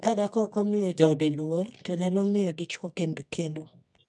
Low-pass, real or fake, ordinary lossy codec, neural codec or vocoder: 10.8 kHz; fake; none; codec, 24 kHz, 0.9 kbps, WavTokenizer, medium music audio release